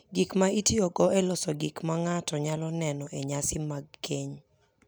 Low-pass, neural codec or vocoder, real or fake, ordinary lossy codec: none; none; real; none